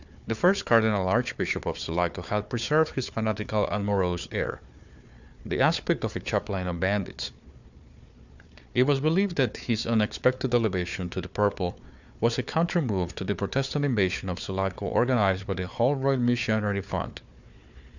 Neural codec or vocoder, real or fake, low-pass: codec, 16 kHz, 4 kbps, FunCodec, trained on Chinese and English, 50 frames a second; fake; 7.2 kHz